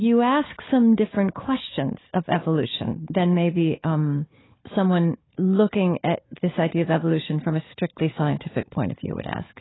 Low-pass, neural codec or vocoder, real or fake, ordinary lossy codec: 7.2 kHz; codec, 16 kHz, 4 kbps, FunCodec, trained on Chinese and English, 50 frames a second; fake; AAC, 16 kbps